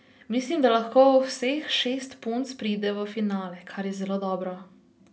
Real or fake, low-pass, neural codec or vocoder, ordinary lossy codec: real; none; none; none